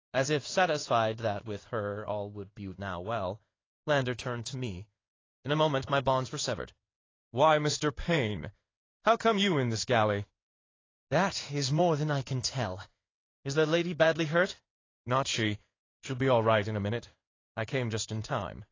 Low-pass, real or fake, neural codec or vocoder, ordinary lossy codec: 7.2 kHz; fake; codec, 16 kHz in and 24 kHz out, 1 kbps, XY-Tokenizer; AAC, 32 kbps